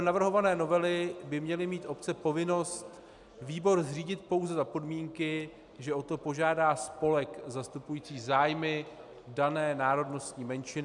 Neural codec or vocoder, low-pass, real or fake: none; 10.8 kHz; real